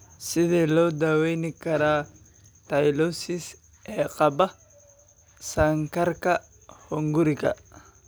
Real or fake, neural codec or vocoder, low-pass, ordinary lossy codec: real; none; none; none